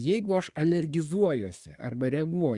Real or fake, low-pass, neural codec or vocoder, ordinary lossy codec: fake; 10.8 kHz; codec, 24 kHz, 1 kbps, SNAC; Opus, 64 kbps